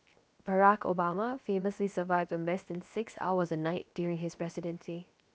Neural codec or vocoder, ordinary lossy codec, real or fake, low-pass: codec, 16 kHz, 0.7 kbps, FocalCodec; none; fake; none